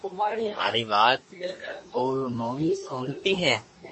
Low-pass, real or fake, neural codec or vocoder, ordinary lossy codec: 10.8 kHz; fake; codec, 24 kHz, 1 kbps, SNAC; MP3, 32 kbps